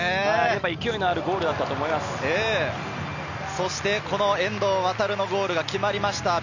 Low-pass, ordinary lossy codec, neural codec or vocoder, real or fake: 7.2 kHz; none; none; real